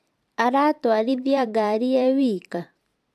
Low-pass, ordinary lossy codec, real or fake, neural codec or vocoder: 14.4 kHz; AAC, 96 kbps; fake; vocoder, 44.1 kHz, 128 mel bands every 512 samples, BigVGAN v2